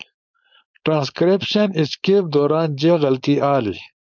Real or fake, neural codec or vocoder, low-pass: fake; codec, 16 kHz, 4.8 kbps, FACodec; 7.2 kHz